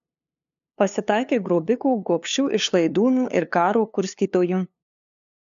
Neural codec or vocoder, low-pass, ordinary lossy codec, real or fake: codec, 16 kHz, 2 kbps, FunCodec, trained on LibriTTS, 25 frames a second; 7.2 kHz; MP3, 64 kbps; fake